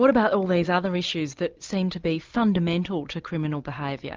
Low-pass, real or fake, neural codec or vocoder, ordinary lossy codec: 7.2 kHz; real; none; Opus, 32 kbps